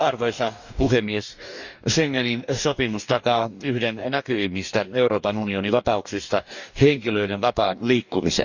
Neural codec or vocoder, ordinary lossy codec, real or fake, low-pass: codec, 44.1 kHz, 2.6 kbps, DAC; none; fake; 7.2 kHz